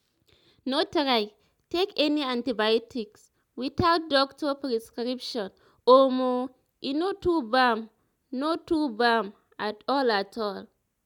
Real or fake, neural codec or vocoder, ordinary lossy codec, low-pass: real; none; none; 19.8 kHz